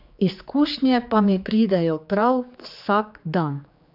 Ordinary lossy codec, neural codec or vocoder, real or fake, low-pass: none; codec, 16 kHz, 4 kbps, X-Codec, HuBERT features, trained on general audio; fake; 5.4 kHz